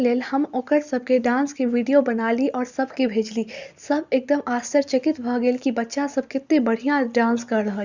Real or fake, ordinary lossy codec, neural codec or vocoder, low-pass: real; Opus, 64 kbps; none; 7.2 kHz